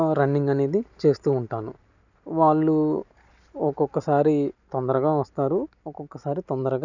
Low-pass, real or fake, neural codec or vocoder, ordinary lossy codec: 7.2 kHz; real; none; none